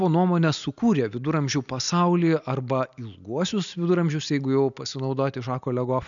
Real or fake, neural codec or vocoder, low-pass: real; none; 7.2 kHz